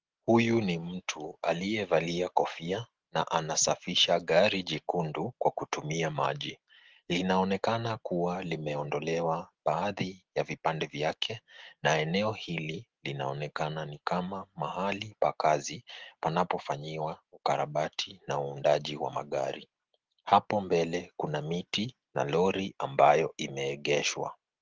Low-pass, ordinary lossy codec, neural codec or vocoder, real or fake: 7.2 kHz; Opus, 16 kbps; none; real